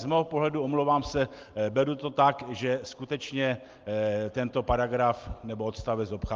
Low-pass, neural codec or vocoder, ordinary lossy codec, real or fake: 7.2 kHz; none; Opus, 32 kbps; real